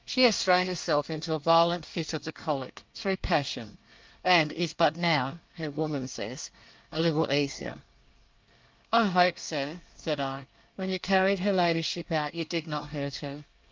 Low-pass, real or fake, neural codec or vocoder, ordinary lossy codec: 7.2 kHz; fake; codec, 24 kHz, 1 kbps, SNAC; Opus, 32 kbps